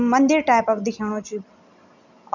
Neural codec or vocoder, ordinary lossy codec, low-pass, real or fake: none; none; 7.2 kHz; real